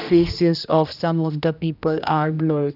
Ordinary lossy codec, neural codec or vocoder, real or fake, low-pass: none; codec, 16 kHz, 1 kbps, X-Codec, HuBERT features, trained on general audio; fake; 5.4 kHz